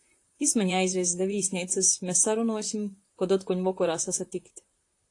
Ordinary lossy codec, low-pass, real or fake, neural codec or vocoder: AAC, 48 kbps; 10.8 kHz; fake; vocoder, 44.1 kHz, 128 mel bands, Pupu-Vocoder